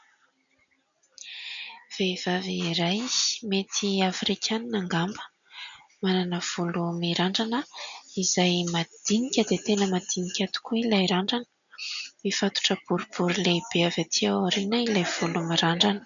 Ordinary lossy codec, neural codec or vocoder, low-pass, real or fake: MP3, 96 kbps; none; 7.2 kHz; real